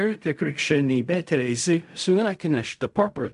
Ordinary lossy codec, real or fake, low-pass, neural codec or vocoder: AAC, 96 kbps; fake; 10.8 kHz; codec, 16 kHz in and 24 kHz out, 0.4 kbps, LongCat-Audio-Codec, fine tuned four codebook decoder